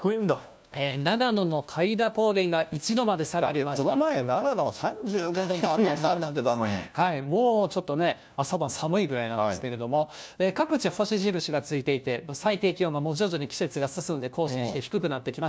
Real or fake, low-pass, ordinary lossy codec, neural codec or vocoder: fake; none; none; codec, 16 kHz, 1 kbps, FunCodec, trained on LibriTTS, 50 frames a second